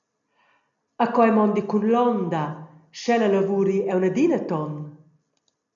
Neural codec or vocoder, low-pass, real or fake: none; 7.2 kHz; real